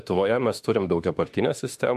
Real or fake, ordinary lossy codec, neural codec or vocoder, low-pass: fake; MP3, 64 kbps; autoencoder, 48 kHz, 32 numbers a frame, DAC-VAE, trained on Japanese speech; 14.4 kHz